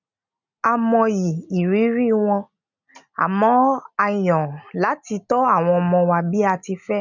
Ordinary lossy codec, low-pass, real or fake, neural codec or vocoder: none; 7.2 kHz; real; none